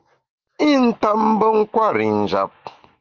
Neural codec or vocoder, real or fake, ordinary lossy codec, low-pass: autoencoder, 48 kHz, 128 numbers a frame, DAC-VAE, trained on Japanese speech; fake; Opus, 24 kbps; 7.2 kHz